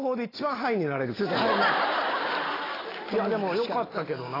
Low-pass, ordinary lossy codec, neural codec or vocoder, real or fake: 5.4 kHz; AAC, 24 kbps; none; real